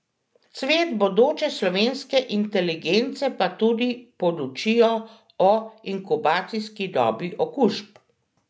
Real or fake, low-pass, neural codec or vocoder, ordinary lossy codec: real; none; none; none